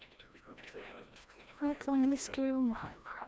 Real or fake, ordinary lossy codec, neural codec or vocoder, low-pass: fake; none; codec, 16 kHz, 1 kbps, FreqCodec, larger model; none